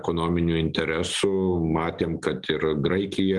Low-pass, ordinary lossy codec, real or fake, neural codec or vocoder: 10.8 kHz; Opus, 24 kbps; real; none